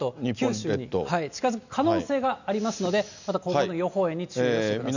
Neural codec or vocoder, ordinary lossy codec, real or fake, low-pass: none; none; real; 7.2 kHz